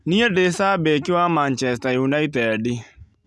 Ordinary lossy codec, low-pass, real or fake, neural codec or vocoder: none; none; real; none